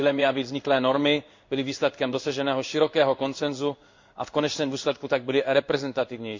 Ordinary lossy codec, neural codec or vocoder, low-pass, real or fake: MP3, 48 kbps; codec, 16 kHz in and 24 kHz out, 1 kbps, XY-Tokenizer; 7.2 kHz; fake